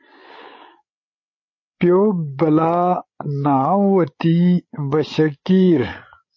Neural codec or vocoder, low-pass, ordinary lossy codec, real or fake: codec, 16 kHz, 8 kbps, FreqCodec, larger model; 7.2 kHz; MP3, 32 kbps; fake